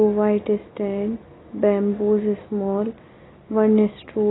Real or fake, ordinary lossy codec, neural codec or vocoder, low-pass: real; AAC, 16 kbps; none; 7.2 kHz